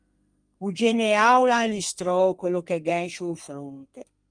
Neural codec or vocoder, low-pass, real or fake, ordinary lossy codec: codec, 32 kHz, 1.9 kbps, SNAC; 9.9 kHz; fake; Opus, 32 kbps